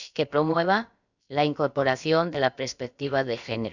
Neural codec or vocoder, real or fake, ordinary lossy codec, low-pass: codec, 16 kHz, about 1 kbps, DyCAST, with the encoder's durations; fake; none; 7.2 kHz